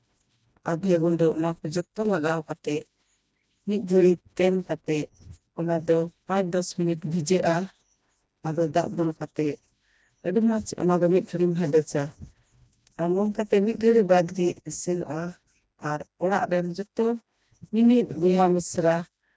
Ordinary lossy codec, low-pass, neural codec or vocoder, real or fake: none; none; codec, 16 kHz, 1 kbps, FreqCodec, smaller model; fake